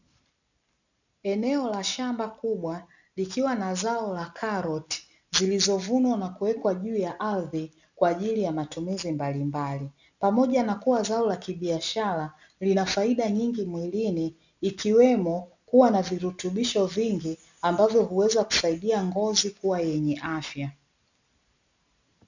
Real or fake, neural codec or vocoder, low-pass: real; none; 7.2 kHz